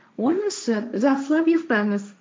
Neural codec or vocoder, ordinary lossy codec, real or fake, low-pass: codec, 16 kHz, 1.1 kbps, Voila-Tokenizer; none; fake; none